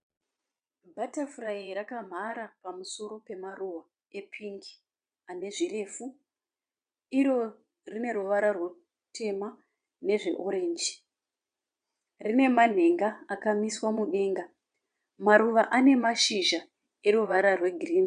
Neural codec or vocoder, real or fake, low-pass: vocoder, 22.05 kHz, 80 mel bands, Vocos; fake; 9.9 kHz